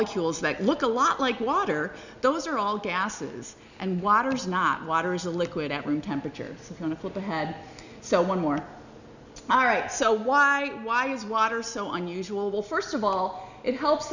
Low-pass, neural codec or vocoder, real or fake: 7.2 kHz; none; real